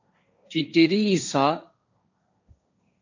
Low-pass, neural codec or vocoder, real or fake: 7.2 kHz; codec, 16 kHz, 1.1 kbps, Voila-Tokenizer; fake